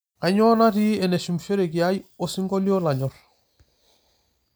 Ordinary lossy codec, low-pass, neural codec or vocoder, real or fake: none; none; none; real